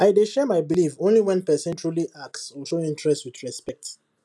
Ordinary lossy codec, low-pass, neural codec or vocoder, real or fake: none; none; none; real